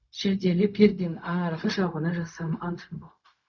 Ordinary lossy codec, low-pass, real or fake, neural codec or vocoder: none; 7.2 kHz; fake; codec, 16 kHz, 0.4 kbps, LongCat-Audio-Codec